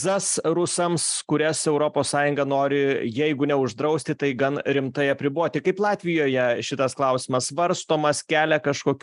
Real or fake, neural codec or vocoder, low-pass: real; none; 10.8 kHz